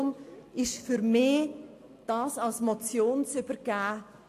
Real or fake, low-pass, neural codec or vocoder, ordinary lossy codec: real; 14.4 kHz; none; AAC, 64 kbps